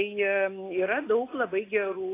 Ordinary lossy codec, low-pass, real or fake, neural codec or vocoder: AAC, 24 kbps; 3.6 kHz; real; none